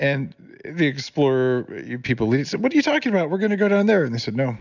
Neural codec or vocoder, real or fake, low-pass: none; real; 7.2 kHz